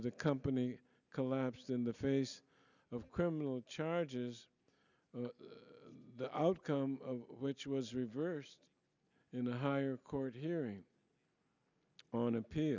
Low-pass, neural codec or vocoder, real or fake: 7.2 kHz; none; real